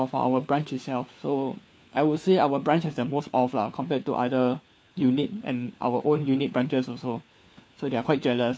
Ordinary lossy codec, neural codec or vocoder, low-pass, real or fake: none; codec, 16 kHz, 4 kbps, FunCodec, trained on LibriTTS, 50 frames a second; none; fake